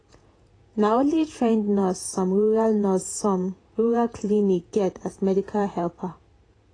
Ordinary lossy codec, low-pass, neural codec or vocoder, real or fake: AAC, 32 kbps; 9.9 kHz; vocoder, 48 kHz, 128 mel bands, Vocos; fake